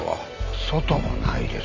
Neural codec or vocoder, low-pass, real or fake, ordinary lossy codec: none; 7.2 kHz; real; AAC, 48 kbps